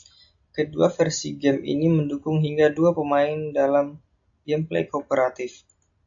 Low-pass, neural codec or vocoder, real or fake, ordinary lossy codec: 7.2 kHz; none; real; AAC, 64 kbps